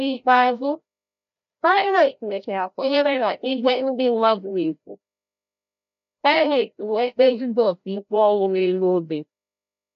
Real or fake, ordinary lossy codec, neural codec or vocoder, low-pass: fake; none; codec, 16 kHz, 0.5 kbps, FreqCodec, larger model; 7.2 kHz